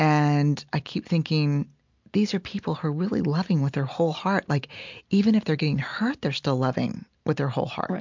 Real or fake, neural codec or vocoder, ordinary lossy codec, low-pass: real; none; MP3, 64 kbps; 7.2 kHz